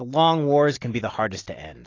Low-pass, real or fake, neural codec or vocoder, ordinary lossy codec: 7.2 kHz; real; none; AAC, 32 kbps